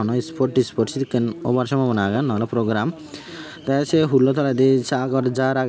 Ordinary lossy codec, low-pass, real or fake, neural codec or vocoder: none; none; real; none